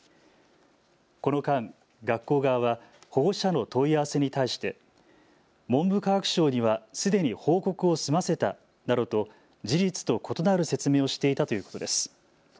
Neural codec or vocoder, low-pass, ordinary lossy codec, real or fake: none; none; none; real